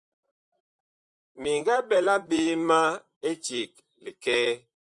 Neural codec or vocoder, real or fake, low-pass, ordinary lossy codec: vocoder, 44.1 kHz, 128 mel bands, Pupu-Vocoder; fake; 10.8 kHz; Opus, 64 kbps